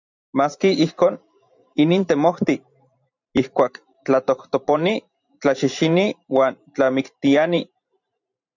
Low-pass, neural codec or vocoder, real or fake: 7.2 kHz; vocoder, 44.1 kHz, 128 mel bands every 512 samples, BigVGAN v2; fake